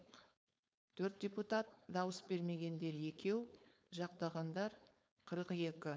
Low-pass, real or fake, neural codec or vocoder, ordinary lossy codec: none; fake; codec, 16 kHz, 4.8 kbps, FACodec; none